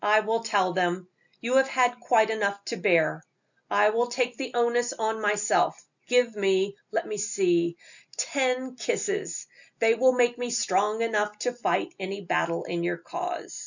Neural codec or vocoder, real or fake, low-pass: none; real; 7.2 kHz